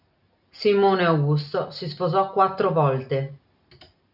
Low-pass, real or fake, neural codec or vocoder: 5.4 kHz; real; none